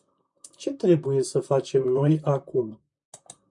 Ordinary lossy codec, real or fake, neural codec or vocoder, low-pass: MP3, 96 kbps; fake; vocoder, 44.1 kHz, 128 mel bands, Pupu-Vocoder; 10.8 kHz